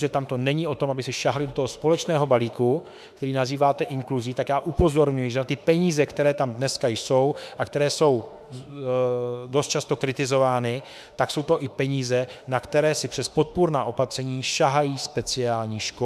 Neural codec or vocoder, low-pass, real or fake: autoencoder, 48 kHz, 32 numbers a frame, DAC-VAE, trained on Japanese speech; 14.4 kHz; fake